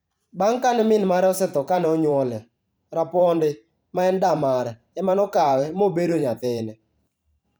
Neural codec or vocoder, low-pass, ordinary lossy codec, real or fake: vocoder, 44.1 kHz, 128 mel bands every 512 samples, BigVGAN v2; none; none; fake